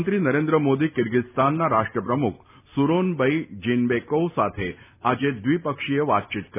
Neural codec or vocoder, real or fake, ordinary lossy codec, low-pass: none; real; none; 3.6 kHz